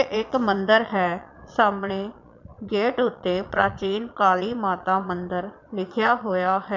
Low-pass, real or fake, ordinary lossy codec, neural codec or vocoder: 7.2 kHz; fake; MP3, 64 kbps; vocoder, 22.05 kHz, 80 mel bands, Vocos